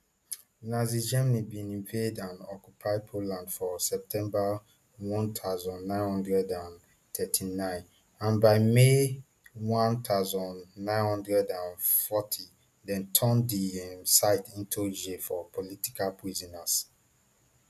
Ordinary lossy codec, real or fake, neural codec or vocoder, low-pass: none; real; none; 14.4 kHz